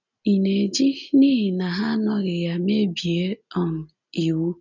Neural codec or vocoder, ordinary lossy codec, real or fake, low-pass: none; none; real; 7.2 kHz